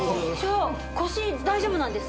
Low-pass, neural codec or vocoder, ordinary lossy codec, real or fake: none; none; none; real